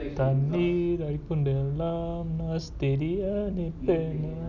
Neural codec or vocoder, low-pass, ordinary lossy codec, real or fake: none; 7.2 kHz; none; real